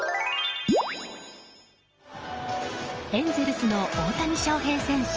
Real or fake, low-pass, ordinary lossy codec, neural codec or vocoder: real; 7.2 kHz; Opus, 24 kbps; none